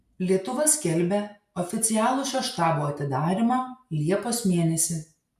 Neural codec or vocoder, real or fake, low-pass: none; real; 14.4 kHz